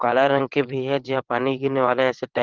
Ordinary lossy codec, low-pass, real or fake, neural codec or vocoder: Opus, 16 kbps; 7.2 kHz; fake; vocoder, 22.05 kHz, 80 mel bands, WaveNeXt